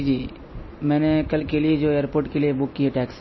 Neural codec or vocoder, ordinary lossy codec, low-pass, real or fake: none; MP3, 24 kbps; 7.2 kHz; real